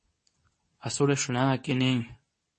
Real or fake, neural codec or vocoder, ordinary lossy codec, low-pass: fake; codec, 24 kHz, 0.9 kbps, WavTokenizer, medium speech release version 2; MP3, 32 kbps; 10.8 kHz